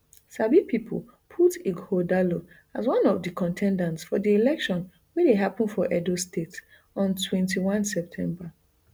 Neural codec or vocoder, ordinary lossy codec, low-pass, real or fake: none; none; none; real